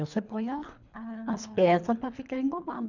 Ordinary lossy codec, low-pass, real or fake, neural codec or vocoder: none; 7.2 kHz; fake; codec, 24 kHz, 3 kbps, HILCodec